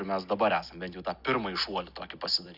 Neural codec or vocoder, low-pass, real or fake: none; 5.4 kHz; real